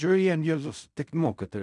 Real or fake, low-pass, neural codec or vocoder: fake; 10.8 kHz; codec, 16 kHz in and 24 kHz out, 0.4 kbps, LongCat-Audio-Codec, fine tuned four codebook decoder